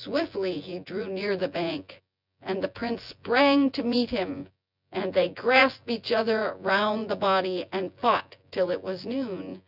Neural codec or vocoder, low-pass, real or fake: vocoder, 24 kHz, 100 mel bands, Vocos; 5.4 kHz; fake